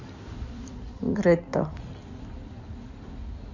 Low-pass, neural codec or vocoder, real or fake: 7.2 kHz; codec, 16 kHz in and 24 kHz out, 2.2 kbps, FireRedTTS-2 codec; fake